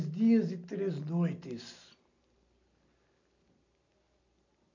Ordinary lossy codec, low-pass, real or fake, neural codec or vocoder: none; 7.2 kHz; real; none